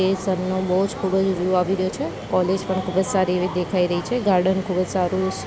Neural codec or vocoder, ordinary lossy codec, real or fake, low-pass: none; none; real; none